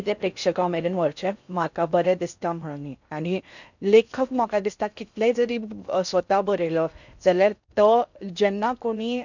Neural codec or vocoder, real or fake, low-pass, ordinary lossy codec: codec, 16 kHz in and 24 kHz out, 0.6 kbps, FocalCodec, streaming, 4096 codes; fake; 7.2 kHz; none